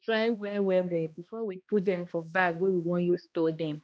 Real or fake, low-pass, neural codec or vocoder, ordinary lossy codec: fake; none; codec, 16 kHz, 1 kbps, X-Codec, HuBERT features, trained on balanced general audio; none